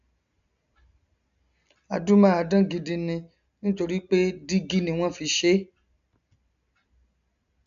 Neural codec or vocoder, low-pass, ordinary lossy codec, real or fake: none; 7.2 kHz; none; real